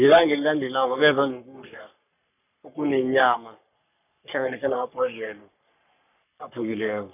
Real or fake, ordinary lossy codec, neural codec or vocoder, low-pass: fake; none; codec, 44.1 kHz, 3.4 kbps, Pupu-Codec; 3.6 kHz